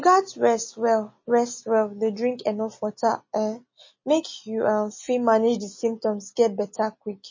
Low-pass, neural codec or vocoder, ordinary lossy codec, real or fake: 7.2 kHz; none; MP3, 32 kbps; real